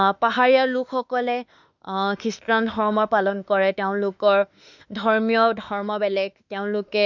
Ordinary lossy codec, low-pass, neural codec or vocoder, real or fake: none; 7.2 kHz; autoencoder, 48 kHz, 32 numbers a frame, DAC-VAE, trained on Japanese speech; fake